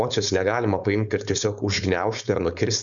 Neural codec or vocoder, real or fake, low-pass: codec, 16 kHz, 4.8 kbps, FACodec; fake; 7.2 kHz